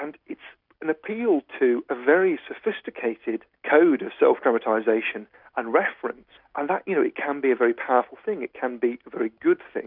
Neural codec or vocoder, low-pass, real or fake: none; 5.4 kHz; real